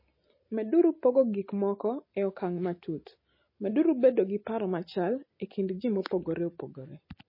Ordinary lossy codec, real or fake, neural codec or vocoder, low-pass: MP3, 24 kbps; real; none; 5.4 kHz